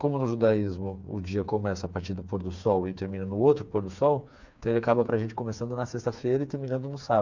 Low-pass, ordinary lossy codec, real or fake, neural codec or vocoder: 7.2 kHz; MP3, 64 kbps; fake; codec, 16 kHz, 4 kbps, FreqCodec, smaller model